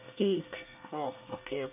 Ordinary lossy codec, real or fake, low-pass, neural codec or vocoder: none; fake; 3.6 kHz; codec, 24 kHz, 1 kbps, SNAC